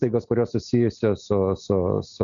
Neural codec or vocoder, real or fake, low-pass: none; real; 7.2 kHz